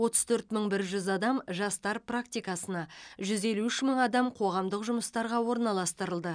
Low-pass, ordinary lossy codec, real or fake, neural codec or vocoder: 9.9 kHz; none; real; none